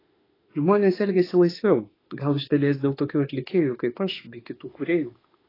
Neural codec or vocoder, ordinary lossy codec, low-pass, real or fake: autoencoder, 48 kHz, 32 numbers a frame, DAC-VAE, trained on Japanese speech; AAC, 24 kbps; 5.4 kHz; fake